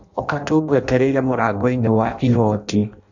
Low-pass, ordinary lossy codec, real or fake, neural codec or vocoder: 7.2 kHz; none; fake; codec, 16 kHz in and 24 kHz out, 0.6 kbps, FireRedTTS-2 codec